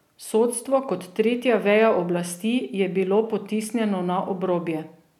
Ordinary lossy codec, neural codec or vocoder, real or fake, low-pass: none; none; real; 19.8 kHz